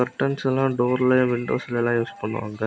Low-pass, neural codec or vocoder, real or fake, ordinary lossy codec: none; none; real; none